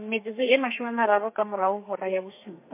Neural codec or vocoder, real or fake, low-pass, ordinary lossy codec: codec, 32 kHz, 1.9 kbps, SNAC; fake; 3.6 kHz; MP3, 24 kbps